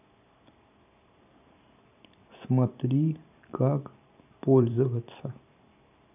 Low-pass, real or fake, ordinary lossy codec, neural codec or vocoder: 3.6 kHz; fake; none; vocoder, 44.1 kHz, 128 mel bands every 512 samples, BigVGAN v2